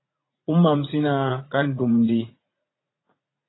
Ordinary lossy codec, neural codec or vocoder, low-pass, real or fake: AAC, 16 kbps; vocoder, 44.1 kHz, 80 mel bands, Vocos; 7.2 kHz; fake